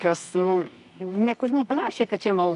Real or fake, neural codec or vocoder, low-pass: fake; codec, 24 kHz, 0.9 kbps, WavTokenizer, medium music audio release; 10.8 kHz